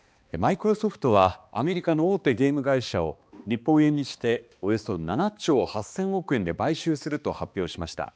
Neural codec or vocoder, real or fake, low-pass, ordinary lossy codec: codec, 16 kHz, 2 kbps, X-Codec, HuBERT features, trained on balanced general audio; fake; none; none